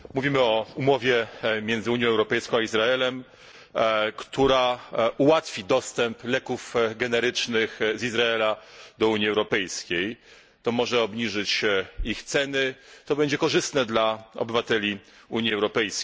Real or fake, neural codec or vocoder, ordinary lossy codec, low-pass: real; none; none; none